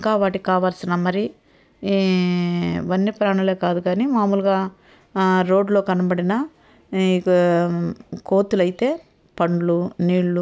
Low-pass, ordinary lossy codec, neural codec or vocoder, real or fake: none; none; none; real